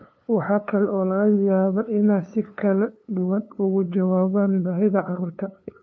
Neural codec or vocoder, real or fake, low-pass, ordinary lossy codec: codec, 16 kHz, 2 kbps, FunCodec, trained on LibriTTS, 25 frames a second; fake; none; none